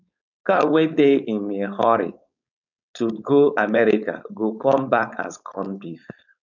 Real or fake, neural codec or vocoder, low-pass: fake; codec, 16 kHz, 4.8 kbps, FACodec; 7.2 kHz